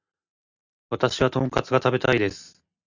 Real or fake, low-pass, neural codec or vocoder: real; 7.2 kHz; none